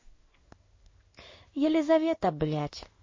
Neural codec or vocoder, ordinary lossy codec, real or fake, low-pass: codec, 16 kHz in and 24 kHz out, 1 kbps, XY-Tokenizer; MP3, 32 kbps; fake; 7.2 kHz